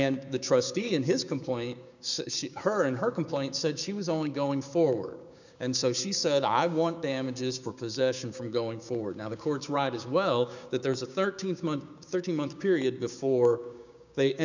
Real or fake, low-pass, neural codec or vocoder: fake; 7.2 kHz; codec, 16 kHz, 6 kbps, DAC